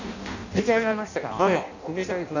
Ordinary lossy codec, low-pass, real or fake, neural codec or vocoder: none; 7.2 kHz; fake; codec, 16 kHz in and 24 kHz out, 0.6 kbps, FireRedTTS-2 codec